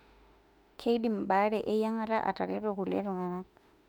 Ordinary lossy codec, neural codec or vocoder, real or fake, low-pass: none; autoencoder, 48 kHz, 32 numbers a frame, DAC-VAE, trained on Japanese speech; fake; 19.8 kHz